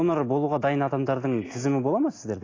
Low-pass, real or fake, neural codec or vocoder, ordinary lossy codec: 7.2 kHz; real; none; AAC, 32 kbps